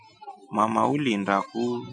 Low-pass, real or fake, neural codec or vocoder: 9.9 kHz; real; none